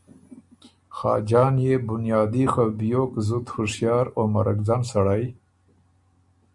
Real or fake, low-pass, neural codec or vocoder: real; 10.8 kHz; none